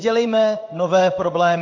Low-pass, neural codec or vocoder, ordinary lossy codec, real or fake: 7.2 kHz; codec, 16 kHz in and 24 kHz out, 1 kbps, XY-Tokenizer; AAC, 48 kbps; fake